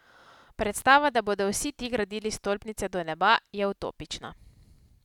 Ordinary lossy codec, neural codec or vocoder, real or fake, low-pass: none; none; real; 19.8 kHz